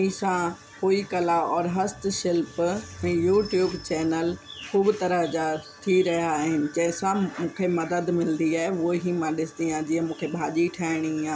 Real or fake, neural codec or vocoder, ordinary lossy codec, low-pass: real; none; none; none